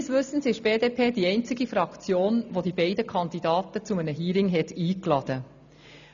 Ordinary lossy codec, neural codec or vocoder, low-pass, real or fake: none; none; 7.2 kHz; real